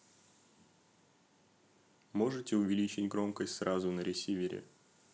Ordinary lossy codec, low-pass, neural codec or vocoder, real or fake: none; none; none; real